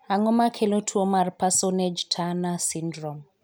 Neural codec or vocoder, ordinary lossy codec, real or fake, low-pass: none; none; real; none